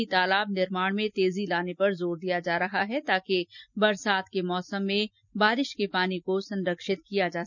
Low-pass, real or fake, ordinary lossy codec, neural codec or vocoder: 7.2 kHz; real; none; none